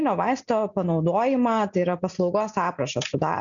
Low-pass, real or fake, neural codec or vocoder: 7.2 kHz; real; none